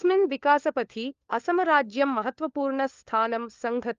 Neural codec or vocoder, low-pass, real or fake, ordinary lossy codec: codec, 16 kHz, 4 kbps, FunCodec, trained on LibriTTS, 50 frames a second; 7.2 kHz; fake; Opus, 32 kbps